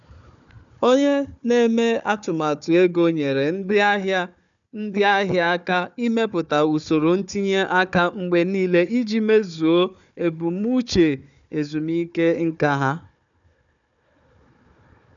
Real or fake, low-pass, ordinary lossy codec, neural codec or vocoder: fake; 7.2 kHz; none; codec, 16 kHz, 4 kbps, FunCodec, trained on Chinese and English, 50 frames a second